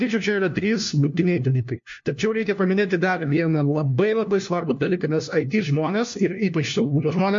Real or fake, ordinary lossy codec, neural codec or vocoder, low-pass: fake; MP3, 48 kbps; codec, 16 kHz, 1 kbps, FunCodec, trained on LibriTTS, 50 frames a second; 7.2 kHz